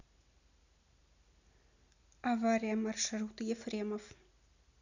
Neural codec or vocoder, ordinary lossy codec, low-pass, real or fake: none; none; 7.2 kHz; real